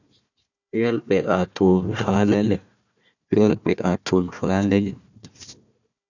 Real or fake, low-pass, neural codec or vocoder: fake; 7.2 kHz; codec, 16 kHz, 1 kbps, FunCodec, trained on Chinese and English, 50 frames a second